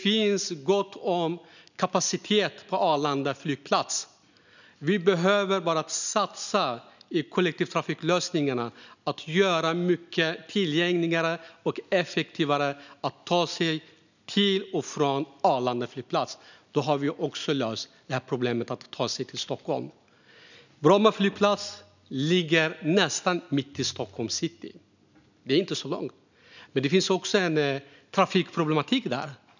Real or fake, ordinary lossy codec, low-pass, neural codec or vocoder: real; none; 7.2 kHz; none